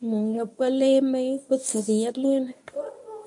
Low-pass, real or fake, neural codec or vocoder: 10.8 kHz; fake; codec, 24 kHz, 0.9 kbps, WavTokenizer, medium speech release version 1